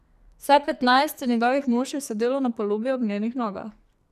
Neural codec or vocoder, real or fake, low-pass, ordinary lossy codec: codec, 44.1 kHz, 2.6 kbps, SNAC; fake; 14.4 kHz; none